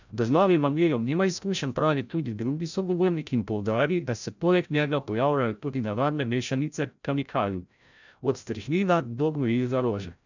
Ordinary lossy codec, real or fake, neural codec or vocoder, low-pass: none; fake; codec, 16 kHz, 0.5 kbps, FreqCodec, larger model; 7.2 kHz